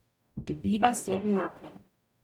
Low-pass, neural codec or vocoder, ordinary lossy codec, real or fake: 19.8 kHz; codec, 44.1 kHz, 0.9 kbps, DAC; none; fake